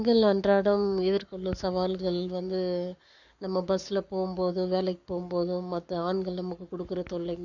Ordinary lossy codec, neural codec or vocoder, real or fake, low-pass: none; codec, 44.1 kHz, 7.8 kbps, Pupu-Codec; fake; 7.2 kHz